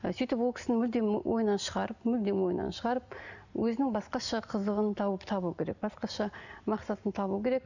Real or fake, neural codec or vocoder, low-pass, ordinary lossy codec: real; none; 7.2 kHz; none